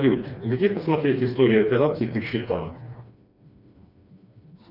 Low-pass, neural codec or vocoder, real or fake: 5.4 kHz; codec, 16 kHz, 2 kbps, FreqCodec, smaller model; fake